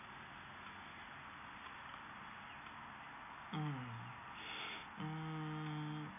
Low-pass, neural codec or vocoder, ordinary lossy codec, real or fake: 3.6 kHz; none; none; real